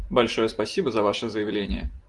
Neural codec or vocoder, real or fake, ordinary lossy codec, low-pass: none; real; Opus, 24 kbps; 10.8 kHz